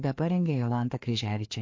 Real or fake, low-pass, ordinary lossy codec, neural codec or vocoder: fake; 7.2 kHz; MP3, 48 kbps; codec, 16 kHz, 2 kbps, FreqCodec, larger model